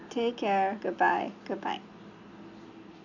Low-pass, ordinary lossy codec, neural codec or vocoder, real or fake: 7.2 kHz; none; none; real